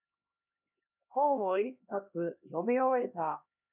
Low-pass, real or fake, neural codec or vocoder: 3.6 kHz; fake; codec, 16 kHz, 1 kbps, X-Codec, HuBERT features, trained on LibriSpeech